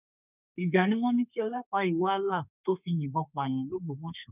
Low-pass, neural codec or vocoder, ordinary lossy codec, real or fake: 3.6 kHz; codec, 32 kHz, 1.9 kbps, SNAC; none; fake